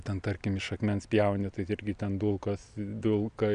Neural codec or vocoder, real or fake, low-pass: none; real; 9.9 kHz